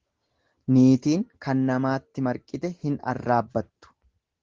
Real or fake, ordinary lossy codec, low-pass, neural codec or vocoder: real; Opus, 16 kbps; 7.2 kHz; none